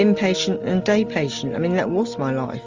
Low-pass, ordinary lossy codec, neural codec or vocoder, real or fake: 7.2 kHz; Opus, 32 kbps; none; real